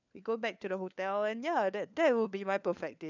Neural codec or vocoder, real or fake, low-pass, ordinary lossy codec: codec, 16 kHz, 4 kbps, FunCodec, trained on LibriTTS, 50 frames a second; fake; 7.2 kHz; none